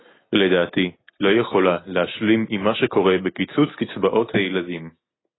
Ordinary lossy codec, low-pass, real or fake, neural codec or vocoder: AAC, 16 kbps; 7.2 kHz; real; none